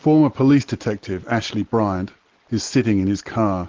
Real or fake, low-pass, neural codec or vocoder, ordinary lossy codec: real; 7.2 kHz; none; Opus, 16 kbps